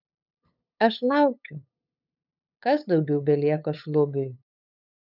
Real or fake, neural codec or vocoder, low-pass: fake; codec, 16 kHz, 8 kbps, FunCodec, trained on LibriTTS, 25 frames a second; 5.4 kHz